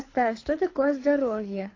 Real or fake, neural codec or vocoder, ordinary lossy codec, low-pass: fake; codec, 24 kHz, 6 kbps, HILCodec; AAC, 32 kbps; 7.2 kHz